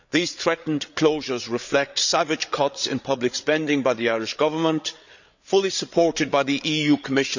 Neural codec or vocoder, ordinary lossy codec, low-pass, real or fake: codec, 16 kHz, 8 kbps, FreqCodec, larger model; none; 7.2 kHz; fake